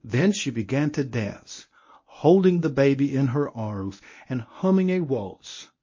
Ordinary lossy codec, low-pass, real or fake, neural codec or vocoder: MP3, 32 kbps; 7.2 kHz; fake; codec, 24 kHz, 0.9 kbps, WavTokenizer, medium speech release version 1